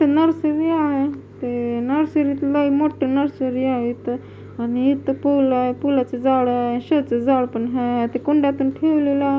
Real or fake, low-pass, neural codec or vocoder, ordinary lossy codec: real; none; none; none